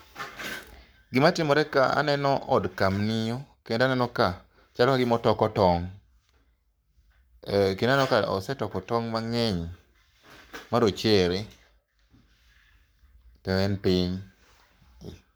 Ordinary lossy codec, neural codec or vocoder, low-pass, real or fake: none; codec, 44.1 kHz, 7.8 kbps, Pupu-Codec; none; fake